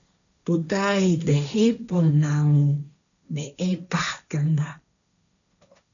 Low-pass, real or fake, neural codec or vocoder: 7.2 kHz; fake; codec, 16 kHz, 1.1 kbps, Voila-Tokenizer